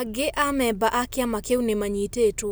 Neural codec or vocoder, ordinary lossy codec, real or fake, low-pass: none; none; real; none